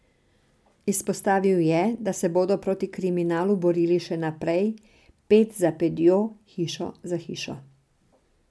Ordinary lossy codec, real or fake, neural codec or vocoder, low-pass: none; real; none; none